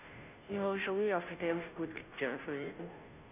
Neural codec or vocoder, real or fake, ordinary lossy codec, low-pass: codec, 16 kHz, 0.5 kbps, FunCodec, trained on Chinese and English, 25 frames a second; fake; none; 3.6 kHz